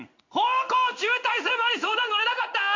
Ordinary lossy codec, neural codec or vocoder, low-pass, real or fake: MP3, 48 kbps; codec, 16 kHz in and 24 kHz out, 1 kbps, XY-Tokenizer; 7.2 kHz; fake